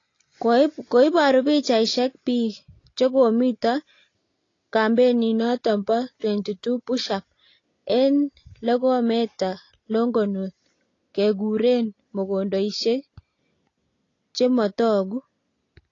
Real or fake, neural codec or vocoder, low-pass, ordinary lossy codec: real; none; 7.2 kHz; AAC, 32 kbps